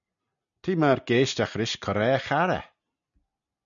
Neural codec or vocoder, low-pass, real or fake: none; 7.2 kHz; real